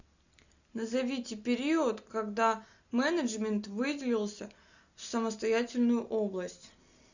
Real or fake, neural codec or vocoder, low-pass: real; none; 7.2 kHz